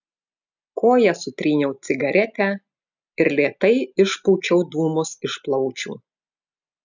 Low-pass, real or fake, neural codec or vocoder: 7.2 kHz; real; none